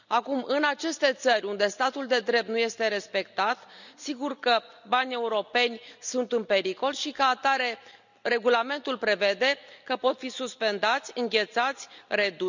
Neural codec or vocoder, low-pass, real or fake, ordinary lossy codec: none; 7.2 kHz; real; none